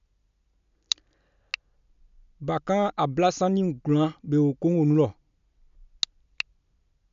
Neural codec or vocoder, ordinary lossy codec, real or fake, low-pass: none; MP3, 96 kbps; real; 7.2 kHz